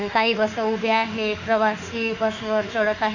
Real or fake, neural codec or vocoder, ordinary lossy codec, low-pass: fake; autoencoder, 48 kHz, 32 numbers a frame, DAC-VAE, trained on Japanese speech; none; 7.2 kHz